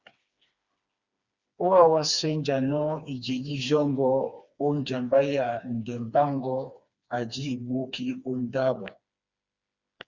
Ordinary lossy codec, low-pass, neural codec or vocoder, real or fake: Opus, 64 kbps; 7.2 kHz; codec, 16 kHz, 2 kbps, FreqCodec, smaller model; fake